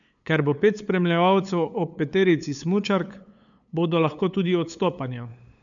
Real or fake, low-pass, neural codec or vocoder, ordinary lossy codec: fake; 7.2 kHz; codec, 16 kHz, 8 kbps, FunCodec, trained on LibriTTS, 25 frames a second; none